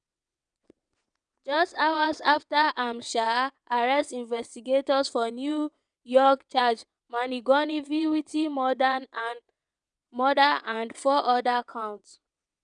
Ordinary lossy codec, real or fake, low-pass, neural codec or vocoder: none; fake; 9.9 kHz; vocoder, 22.05 kHz, 80 mel bands, WaveNeXt